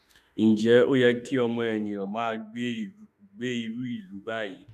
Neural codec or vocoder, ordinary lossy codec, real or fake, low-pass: autoencoder, 48 kHz, 32 numbers a frame, DAC-VAE, trained on Japanese speech; none; fake; 14.4 kHz